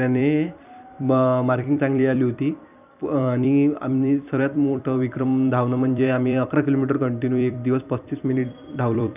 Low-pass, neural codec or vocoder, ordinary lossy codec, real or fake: 3.6 kHz; vocoder, 44.1 kHz, 128 mel bands every 256 samples, BigVGAN v2; none; fake